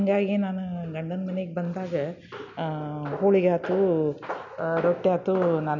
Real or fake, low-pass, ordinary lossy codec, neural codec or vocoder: real; 7.2 kHz; none; none